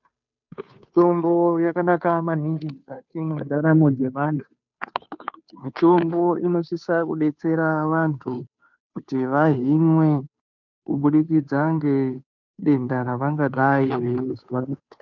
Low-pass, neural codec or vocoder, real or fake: 7.2 kHz; codec, 16 kHz, 2 kbps, FunCodec, trained on Chinese and English, 25 frames a second; fake